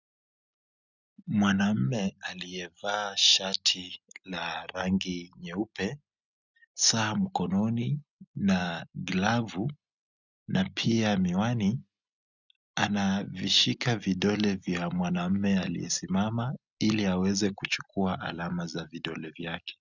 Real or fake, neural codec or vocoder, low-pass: real; none; 7.2 kHz